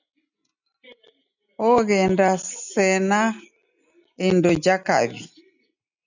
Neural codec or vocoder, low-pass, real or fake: none; 7.2 kHz; real